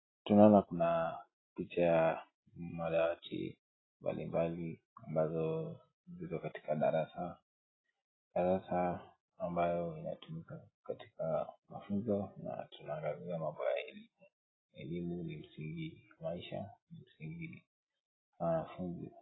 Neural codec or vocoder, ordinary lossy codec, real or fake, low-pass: none; AAC, 16 kbps; real; 7.2 kHz